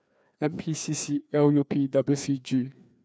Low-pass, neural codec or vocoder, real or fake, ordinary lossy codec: none; codec, 16 kHz, 2 kbps, FreqCodec, larger model; fake; none